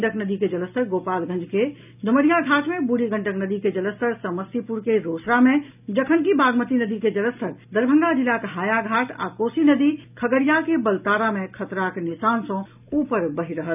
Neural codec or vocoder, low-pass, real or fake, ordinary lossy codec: none; 3.6 kHz; real; none